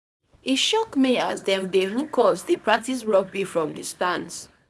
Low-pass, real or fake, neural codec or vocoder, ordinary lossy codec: none; fake; codec, 24 kHz, 0.9 kbps, WavTokenizer, small release; none